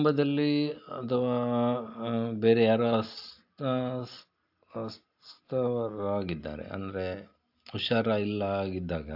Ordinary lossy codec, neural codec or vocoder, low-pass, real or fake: AAC, 48 kbps; none; 5.4 kHz; real